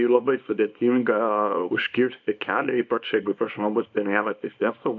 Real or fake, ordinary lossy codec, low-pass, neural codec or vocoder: fake; MP3, 64 kbps; 7.2 kHz; codec, 24 kHz, 0.9 kbps, WavTokenizer, small release